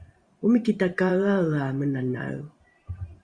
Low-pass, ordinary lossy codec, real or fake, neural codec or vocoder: 9.9 kHz; Opus, 64 kbps; fake; vocoder, 44.1 kHz, 128 mel bands every 512 samples, BigVGAN v2